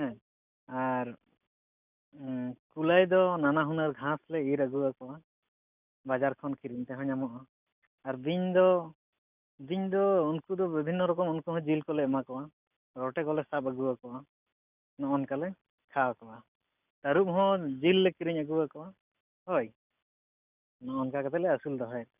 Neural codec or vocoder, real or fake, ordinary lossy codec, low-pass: none; real; none; 3.6 kHz